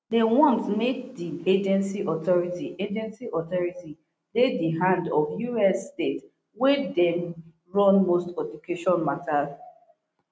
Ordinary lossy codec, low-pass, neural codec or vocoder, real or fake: none; none; none; real